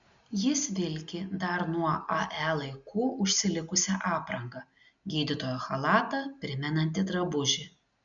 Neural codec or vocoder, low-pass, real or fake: none; 7.2 kHz; real